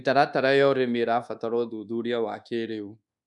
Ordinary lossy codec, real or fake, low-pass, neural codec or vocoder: none; fake; 10.8 kHz; codec, 24 kHz, 1.2 kbps, DualCodec